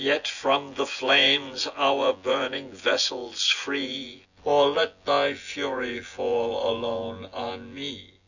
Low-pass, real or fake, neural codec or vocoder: 7.2 kHz; fake; vocoder, 24 kHz, 100 mel bands, Vocos